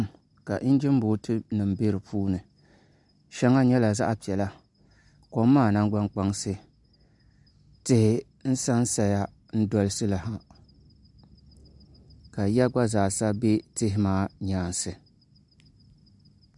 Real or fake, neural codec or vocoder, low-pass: real; none; 10.8 kHz